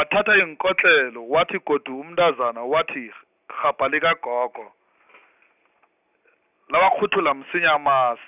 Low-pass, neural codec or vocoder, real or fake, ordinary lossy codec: 3.6 kHz; none; real; none